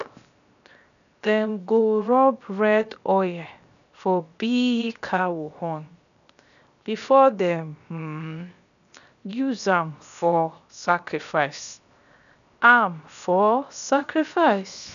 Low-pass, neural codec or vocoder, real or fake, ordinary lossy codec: 7.2 kHz; codec, 16 kHz, 0.7 kbps, FocalCodec; fake; none